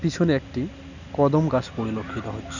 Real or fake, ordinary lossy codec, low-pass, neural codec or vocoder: real; none; 7.2 kHz; none